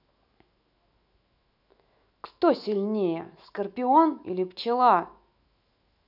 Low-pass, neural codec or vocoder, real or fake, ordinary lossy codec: 5.4 kHz; autoencoder, 48 kHz, 128 numbers a frame, DAC-VAE, trained on Japanese speech; fake; none